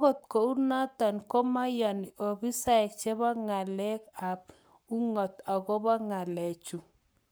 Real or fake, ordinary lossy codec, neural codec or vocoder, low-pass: fake; none; codec, 44.1 kHz, 7.8 kbps, Pupu-Codec; none